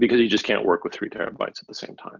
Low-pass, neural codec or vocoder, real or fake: 7.2 kHz; none; real